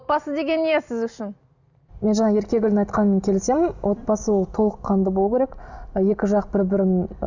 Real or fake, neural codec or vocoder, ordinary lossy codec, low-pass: real; none; none; 7.2 kHz